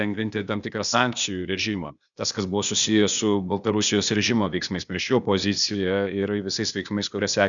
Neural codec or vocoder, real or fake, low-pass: codec, 16 kHz, 0.8 kbps, ZipCodec; fake; 7.2 kHz